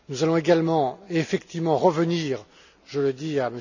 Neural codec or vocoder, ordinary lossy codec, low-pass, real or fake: none; none; 7.2 kHz; real